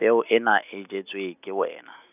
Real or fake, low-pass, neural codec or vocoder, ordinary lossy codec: real; 3.6 kHz; none; none